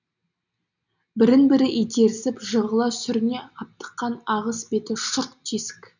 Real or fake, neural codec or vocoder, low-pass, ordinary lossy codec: real; none; 7.2 kHz; none